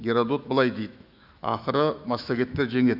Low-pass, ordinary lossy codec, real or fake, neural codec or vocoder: 5.4 kHz; Opus, 64 kbps; fake; autoencoder, 48 kHz, 128 numbers a frame, DAC-VAE, trained on Japanese speech